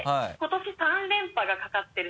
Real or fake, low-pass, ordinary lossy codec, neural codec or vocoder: real; none; none; none